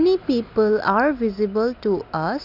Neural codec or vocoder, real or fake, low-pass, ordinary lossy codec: none; real; 5.4 kHz; none